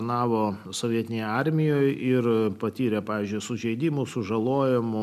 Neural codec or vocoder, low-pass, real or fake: none; 14.4 kHz; real